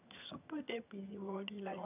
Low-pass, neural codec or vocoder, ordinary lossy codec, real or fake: 3.6 kHz; vocoder, 22.05 kHz, 80 mel bands, HiFi-GAN; none; fake